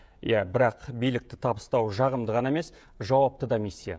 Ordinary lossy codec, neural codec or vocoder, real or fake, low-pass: none; codec, 16 kHz, 16 kbps, FreqCodec, smaller model; fake; none